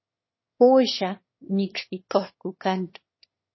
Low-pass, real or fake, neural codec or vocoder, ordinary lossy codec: 7.2 kHz; fake; autoencoder, 22.05 kHz, a latent of 192 numbers a frame, VITS, trained on one speaker; MP3, 24 kbps